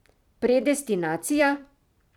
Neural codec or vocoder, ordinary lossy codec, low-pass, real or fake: vocoder, 44.1 kHz, 128 mel bands every 256 samples, BigVGAN v2; none; 19.8 kHz; fake